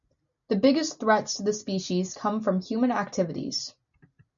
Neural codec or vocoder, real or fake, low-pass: none; real; 7.2 kHz